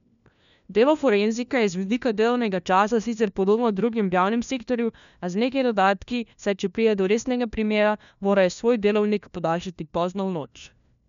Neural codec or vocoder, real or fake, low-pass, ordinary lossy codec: codec, 16 kHz, 1 kbps, FunCodec, trained on LibriTTS, 50 frames a second; fake; 7.2 kHz; none